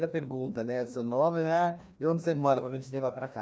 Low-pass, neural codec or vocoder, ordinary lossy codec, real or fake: none; codec, 16 kHz, 1 kbps, FreqCodec, larger model; none; fake